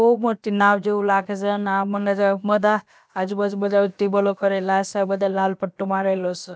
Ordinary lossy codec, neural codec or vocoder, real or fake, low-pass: none; codec, 16 kHz, about 1 kbps, DyCAST, with the encoder's durations; fake; none